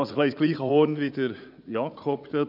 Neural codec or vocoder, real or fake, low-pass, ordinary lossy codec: vocoder, 44.1 kHz, 80 mel bands, Vocos; fake; 5.4 kHz; none